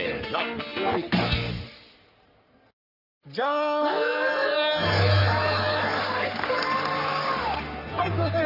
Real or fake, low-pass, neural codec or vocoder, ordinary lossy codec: fake; 5.4 kHz; codec, 44.1 kHz, 3.4 kbps, Pupu-Codec; Opus, 32 kbps